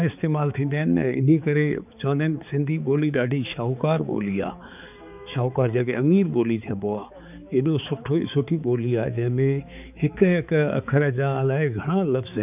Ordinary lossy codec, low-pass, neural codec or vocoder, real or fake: none; 3.6 kHz; codec, 16 kHz, 4 kbps, X-Codec, HuBERT features, trained on balanced general audio; fake